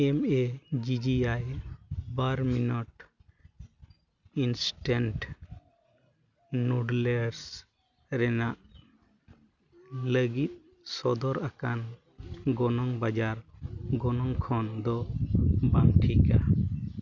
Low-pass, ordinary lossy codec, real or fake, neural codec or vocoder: 7.2 kHz; none; real; none